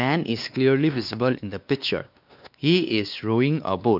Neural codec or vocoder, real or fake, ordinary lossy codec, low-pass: codec, 16 kHz, 2 kbps, X-Codec, WavLM features, trained on Multilingual LibriSpeech; fake; none; 5.4 kHz